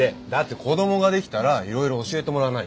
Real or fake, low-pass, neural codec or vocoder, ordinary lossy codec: real; none; none; none